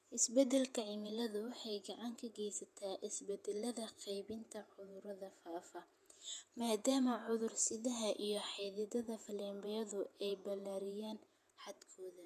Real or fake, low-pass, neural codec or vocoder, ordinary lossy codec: fake; 14.4 kHz; vocoder, 48 kHz, 128 mel bands, Vocos; none